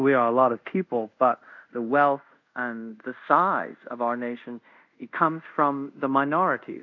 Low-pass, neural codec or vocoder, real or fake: 7.2 kHz; codec, 24 kHz, 0.5 kbps, DualCodec; fake